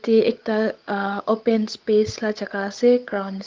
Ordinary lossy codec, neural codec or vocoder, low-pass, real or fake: Opus, 32 kbps; codec, 16 kHz, 8 kbps, FunCodec, trained on Chinese and English, 25 frames a second; 7.2 kHz; fake